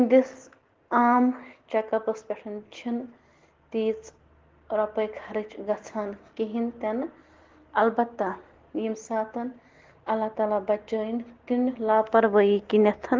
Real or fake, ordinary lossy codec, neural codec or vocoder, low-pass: real; Opus, 16 kbps; none; 7.2 kHz